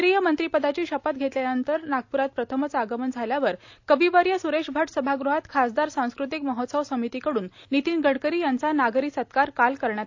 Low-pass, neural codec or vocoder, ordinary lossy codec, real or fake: 7.2 kHz; none; AAC, 48 kbps; real